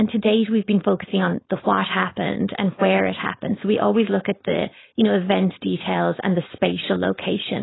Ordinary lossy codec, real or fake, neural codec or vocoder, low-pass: AAC, 16 kbps; fake; codec, 16 kHz, 4.8 kbps, FACodec; 7.2 kHz